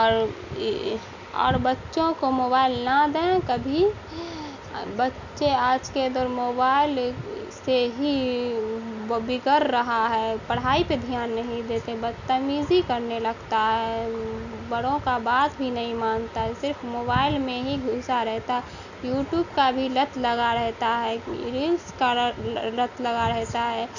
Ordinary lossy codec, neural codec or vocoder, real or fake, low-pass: none; none; real; 7.2 kHz